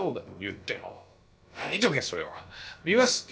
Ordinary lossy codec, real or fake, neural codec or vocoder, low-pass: none; fake; codec, 16 kHz, about 1 kbps, DyCAST, with the encoder's durations; none